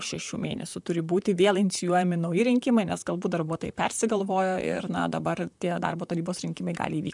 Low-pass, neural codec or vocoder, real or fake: 10.8 kHz; vocoder, 44.1 kHz, 128 mel bands, Pupu-Vocoder; fake